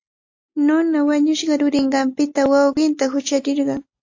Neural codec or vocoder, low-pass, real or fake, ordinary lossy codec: none; 7.2 kHz; real; AAC, 48 kbps